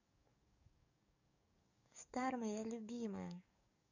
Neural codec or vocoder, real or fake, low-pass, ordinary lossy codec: codec, 44.1 kHz, 7.8 kbps, DAC; fake; 7.2 kHz; none